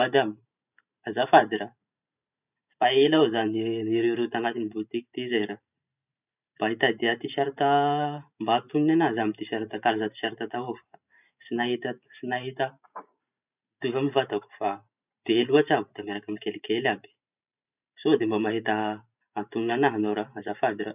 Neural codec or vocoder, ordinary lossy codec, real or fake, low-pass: vocoder, 44.1 kHz, 128 mel bands every 512 samples, BigVGAN v2; none; fake; 3.6 kHz